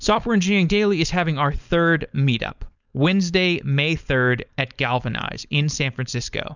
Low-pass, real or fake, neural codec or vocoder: 7.2 kHz; fake; codec, 16 kHz, 4.8 kbps, FACodec